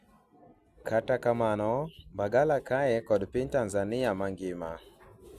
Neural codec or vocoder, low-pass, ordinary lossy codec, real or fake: none; 14.4 kHz; Opus, 64 kbps; real